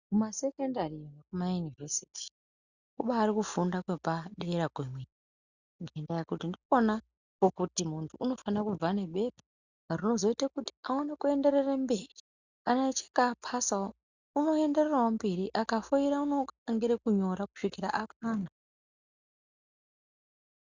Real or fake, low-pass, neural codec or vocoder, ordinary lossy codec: real; 7.2 kHz; none; Opus, 64 kbps